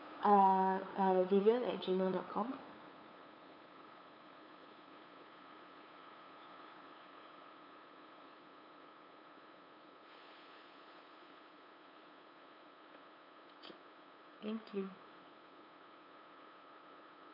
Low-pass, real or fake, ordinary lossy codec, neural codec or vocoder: 5.4 kHz; fake; none; codec, 16 kHz, 8 kbps, FunCodec, trained on LibriTTS, 25 frames a second